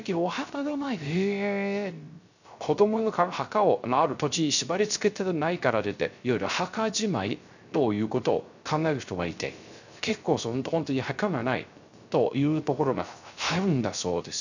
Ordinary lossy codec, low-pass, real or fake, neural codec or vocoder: none; 7.2 kHz; fake; codec, 16 kHz, 0.3 kbps, FocalCodec